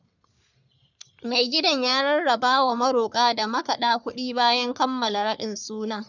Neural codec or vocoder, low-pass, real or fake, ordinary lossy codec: codec, 44.1 kHz, 3.4 kbps, Pupu-Codec; 7.2 kHz; fake; none